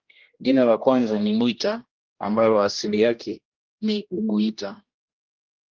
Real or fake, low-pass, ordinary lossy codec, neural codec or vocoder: fake; 7.2 kHz; Opus, 32 kbps; codec, 16 kHz, 1 kbps, X-Codec, HuBERT features, trained on general audio